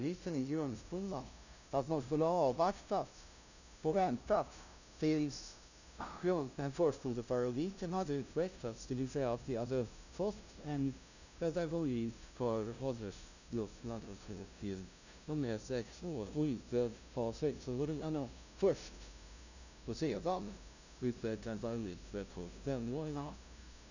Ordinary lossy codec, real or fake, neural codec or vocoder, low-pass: none; fake; codec, 16 kHz, 0.5 kbps, FunCodec, trained on LibriTTS, 25 frames a second; 7.2 kHz